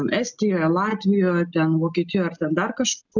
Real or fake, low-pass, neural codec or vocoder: real; 7.2 kHz; none